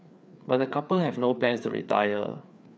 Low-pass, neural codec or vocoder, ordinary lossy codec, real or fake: none; codec, 16 kHz, 4 kbps, FreqCodec, larger model; none; fake